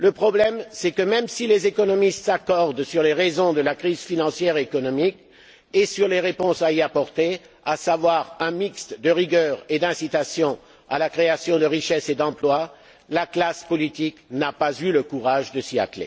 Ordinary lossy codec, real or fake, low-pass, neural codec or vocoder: none; real; none; none